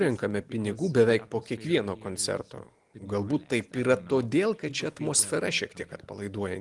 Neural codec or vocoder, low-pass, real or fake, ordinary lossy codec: none; 10.8 kHz; real; Opus, 24 kbps